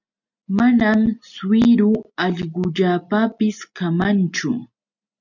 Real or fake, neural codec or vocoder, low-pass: real; none; 7.2 kHz